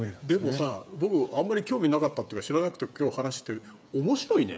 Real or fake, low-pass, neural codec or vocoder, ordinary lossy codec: fake; none; codec, 16 kHz, 8 kbps, FreqCodec, smaller model; none